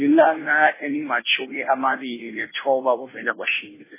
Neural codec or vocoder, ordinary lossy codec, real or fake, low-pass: codec, 16 kHz, 0.5 kbps, FunCodec, trained on Chinese and English, 25 frames a second; MP3, 16 kbps; fake; 3.6 kHz